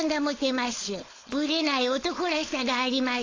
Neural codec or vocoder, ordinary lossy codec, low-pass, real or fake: codec, 16 kHz, 4.8 kbps, FACodec; AAC, 32 kbps; 7.2 kHz; fake